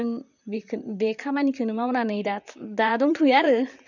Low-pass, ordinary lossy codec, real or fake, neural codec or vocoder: 7.2 kHz; none; fake; vocoder, 44.1 kHz, 128 mel bands, Pupu-Vocoder